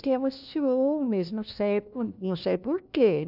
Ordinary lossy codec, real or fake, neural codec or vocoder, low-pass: none; fake; codec, 16 kHz, 1 kbps, FunCodec, trained on LibriTTS, 50 frames a second; 5.4 kHz